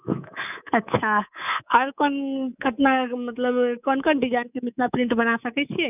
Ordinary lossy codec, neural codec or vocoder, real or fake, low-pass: none; codec, 24 kHz, 3.1 kbps, DualCodec; fake; 3.6 kHz